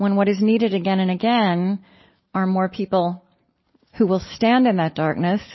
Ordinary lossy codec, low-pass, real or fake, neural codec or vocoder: MP3, 24 kbps; 7.2 kHz; real; none